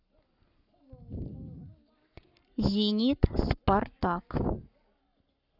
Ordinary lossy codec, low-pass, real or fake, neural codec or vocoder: none; 5.4 kHz; fake; codec, 44.1 kHz, 7.8 kbps, Pupu-Codec